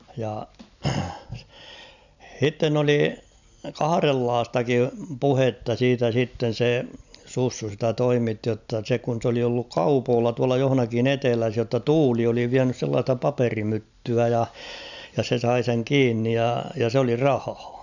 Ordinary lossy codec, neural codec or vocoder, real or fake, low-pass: none; none; real; 7.2 kHz